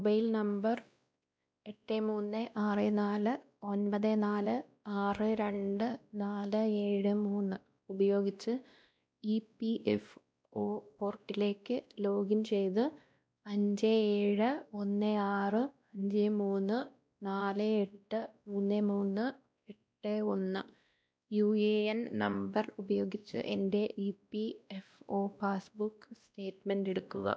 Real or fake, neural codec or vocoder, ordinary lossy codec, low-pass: fake; codec, 16 kHz, 1 kbps, X-Codec, WavLM features, trained on Multilingual LibriSpeech; none; none